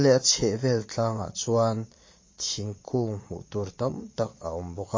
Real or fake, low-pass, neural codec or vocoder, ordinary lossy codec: fake; 7.2 kHz; vocoder, 22.05 kHz, 80 mel bands, Vocos; MP3, 32 kbps